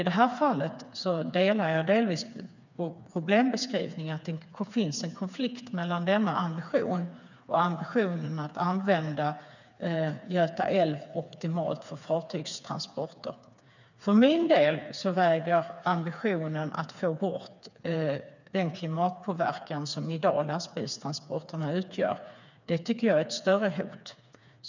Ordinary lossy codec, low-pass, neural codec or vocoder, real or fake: none; 7.2 kHz; codec, 16 kHz, 4 kbps, FreqCodec, smaller model; fake